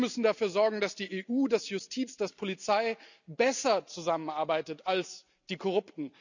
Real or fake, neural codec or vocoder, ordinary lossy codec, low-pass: real; none; none; 7.2 kHz